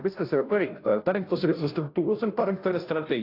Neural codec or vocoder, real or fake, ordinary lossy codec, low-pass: codec, 16 kHz, 0.5 kbps, FunCodec, trained on Chinese and English, 25 frames a second; fake; AAC, 32 kbps; 5.4 kHz